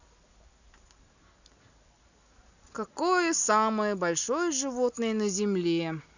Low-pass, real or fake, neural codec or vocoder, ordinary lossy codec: 7.2 kHz; real; none; none